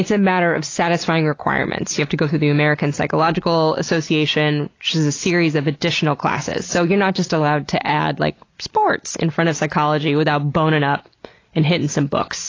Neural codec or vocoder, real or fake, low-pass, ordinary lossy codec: none; real; 7.2 kHz; AAC, 32 kbps